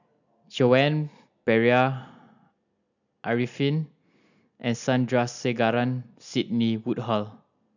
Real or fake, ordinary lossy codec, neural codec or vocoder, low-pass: real; none; none; 7.2 kHz